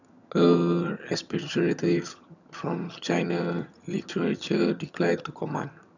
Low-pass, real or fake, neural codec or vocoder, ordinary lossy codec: 7.2 kHz; fake; vocoder, 22.05 kHz, 80 mel bands, HiFi-GAN; none